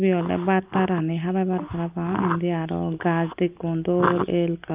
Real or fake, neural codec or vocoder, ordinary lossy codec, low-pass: real; none; Opus, 24 kbps; 3.6 kHz